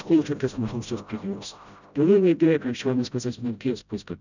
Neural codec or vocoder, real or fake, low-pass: codec, 16 kHz, 0.5 kbps, FreqCodec, smaller model; fake; 7.2 kHz